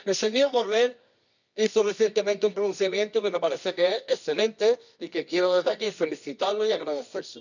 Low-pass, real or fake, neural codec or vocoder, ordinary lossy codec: 7.2 kHz; fake; codec, 24 kHz, 0.9 kbps, WavTokenizer, medium music audio release; none